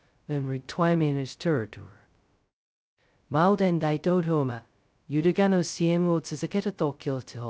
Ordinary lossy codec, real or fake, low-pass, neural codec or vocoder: none; fake; none; codec, 16 kHz, 0.2 kbps, FocalCodec